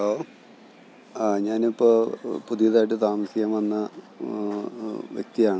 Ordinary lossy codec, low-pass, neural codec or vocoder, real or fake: none; none; none; real